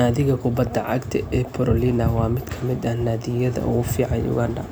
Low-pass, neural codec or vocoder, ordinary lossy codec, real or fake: none; none; none; real